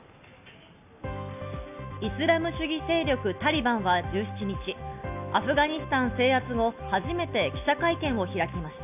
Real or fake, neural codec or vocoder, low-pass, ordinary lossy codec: real; none; 3.6 kHz; none